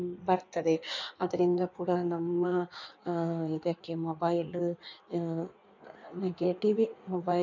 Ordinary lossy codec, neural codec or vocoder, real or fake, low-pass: none; codec, 16 kHz in and 24 kHz out, 1.1 kbps, FireRedTTS-2 codec; fake; 7.2 kHz